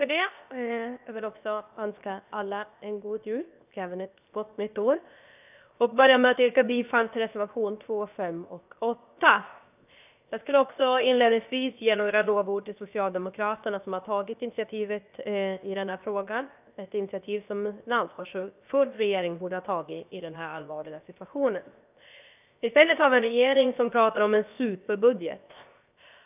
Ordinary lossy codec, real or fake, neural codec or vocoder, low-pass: none; fake; codec, 16 kHz, 0.7 kbps, FocalCodec; 3.6 kHz